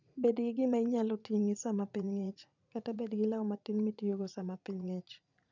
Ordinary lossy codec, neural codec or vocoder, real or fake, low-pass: none; vocoder, 44.1 kHz, 80 mel bands, Vocos; fake; 7.2 kHz